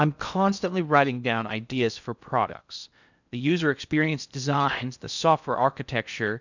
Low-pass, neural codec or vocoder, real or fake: 7.2 kHz; codec, 16 kHz in and 24 kHz out, 0.6 kbps, FocalCodec, streaming, 2048 codes; fake